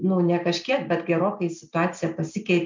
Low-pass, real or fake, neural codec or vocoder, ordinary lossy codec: 7.2 kHz; real; none; MP3, 64 kbps